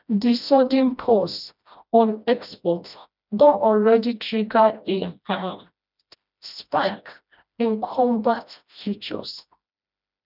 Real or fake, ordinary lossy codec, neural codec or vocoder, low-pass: fake; none; codec, 16 kHz, 1 kbps, FreqCodec, smaller model; 5.4 kHz